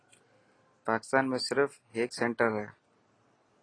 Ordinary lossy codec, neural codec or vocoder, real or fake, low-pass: AAC, 32 kbps; none; real; 9.9 kHz